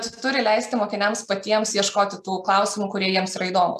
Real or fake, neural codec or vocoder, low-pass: real; none; 14.4 kHz